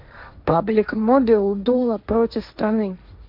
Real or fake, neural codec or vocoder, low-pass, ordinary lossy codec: fake; codec, 16 kHz, 1.1 kbps, Voila-Tokenizer; 5.4 kHz; MP3, 48 kbps